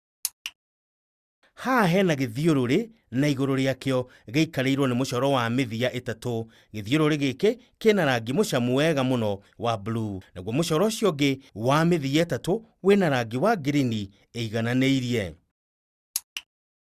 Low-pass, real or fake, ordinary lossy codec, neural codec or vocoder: 14.4 kHz; real; Opus, 64 kbps; none